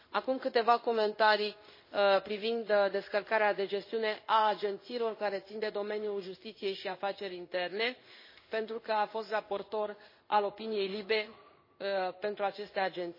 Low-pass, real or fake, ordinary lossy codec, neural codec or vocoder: 5.4 kHz; fake; MP3, 24 kbps; codec, 16 kHz in and 24 kHz out, 1 kbps, XY-Tokenizer